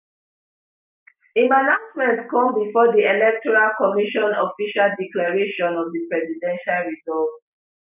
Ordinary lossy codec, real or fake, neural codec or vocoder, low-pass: none; real; none; 3.6 kHz